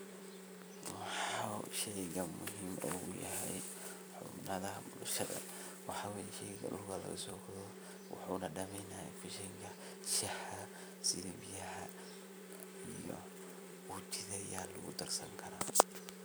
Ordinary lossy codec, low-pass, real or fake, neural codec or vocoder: none; none; fake; vocoder, 44.1 kHz, 128 mel bands every 256 samples, BigVGAN v2